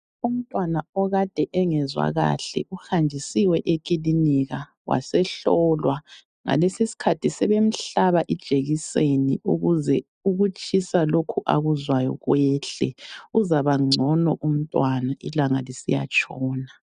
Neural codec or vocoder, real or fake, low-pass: none; real; 9.9 kHz